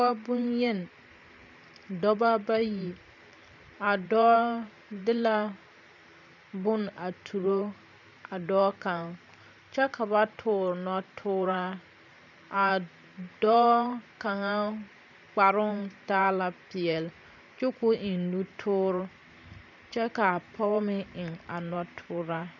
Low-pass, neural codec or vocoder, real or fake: 7.2 kHz; vocoder, 44.1 kHz, 128 mel bands every 512 samples, BigVGAN v2; fake